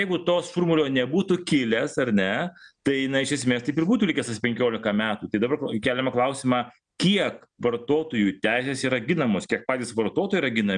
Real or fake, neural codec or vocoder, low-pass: real; none; 9.9 kHz